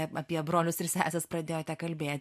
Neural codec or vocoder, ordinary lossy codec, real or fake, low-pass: none; MP3, 64 kbps; real; 14.4 kHz